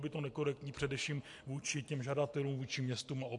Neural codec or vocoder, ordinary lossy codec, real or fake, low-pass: none; MP3, 64 kbps; real; 10.8 kHz